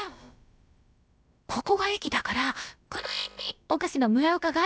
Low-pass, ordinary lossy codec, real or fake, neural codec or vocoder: none; none; fake; codec, 16 kHz, about 1 kbps, DyCAST, with the encoder's durations